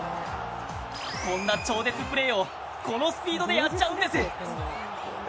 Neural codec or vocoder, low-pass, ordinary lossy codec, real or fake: none; none; none; real